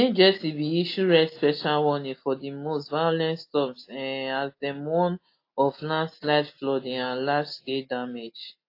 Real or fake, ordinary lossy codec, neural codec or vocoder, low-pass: real; AAC, 32 kbps; none; 5.4 kHz